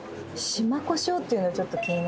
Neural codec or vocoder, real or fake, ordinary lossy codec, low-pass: none; real; none; none